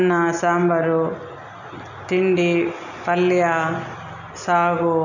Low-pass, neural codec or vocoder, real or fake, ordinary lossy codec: 7.2 kHz; none; real; none